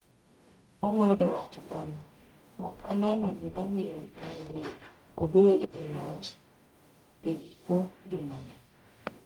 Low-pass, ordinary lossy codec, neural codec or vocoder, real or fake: 19.8 kHz; Opus, 32 kbps; codec, 44.1 kHz, 0.9 kbps, DAC; fake